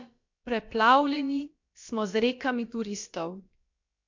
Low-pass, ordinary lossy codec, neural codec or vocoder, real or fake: 7.2 kHz; MP3, 48 kbps; codec, 16 kHz, about 1 kbps, DyCAST, with the encoder's durations; fake